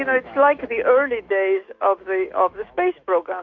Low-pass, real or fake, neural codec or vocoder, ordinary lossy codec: 7.2 kHz; fake; autoencoder, 48 kHz, 128 numbers a frame, DAC-VAE, trained on Japanese speech; Opus, 64 kbps